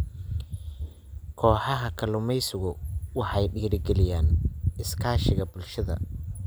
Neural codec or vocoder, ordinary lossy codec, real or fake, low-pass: none; none; real; none